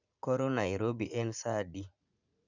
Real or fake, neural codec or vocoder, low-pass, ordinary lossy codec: fake; vocoder, 44.1 kHz, 80 mel bands, Vocos; 7.2 kHz; none